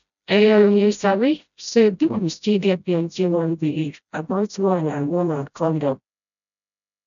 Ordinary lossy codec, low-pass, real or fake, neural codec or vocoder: none; 7.2 kHz; fake; codec, 16 kHz, 0.5 kbps, FreqCodec, smaller model